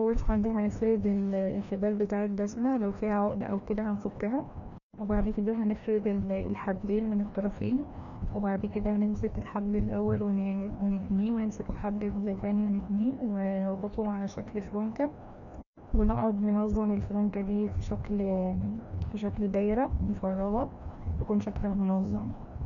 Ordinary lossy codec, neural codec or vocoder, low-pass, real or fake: none; codec, 16 kHz, 1 kbps, FreqCodec, larger model; 7.2 kHz; fake